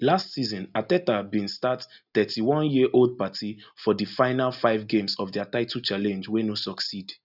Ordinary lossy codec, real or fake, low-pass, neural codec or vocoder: none; real; 5.4 kHz; none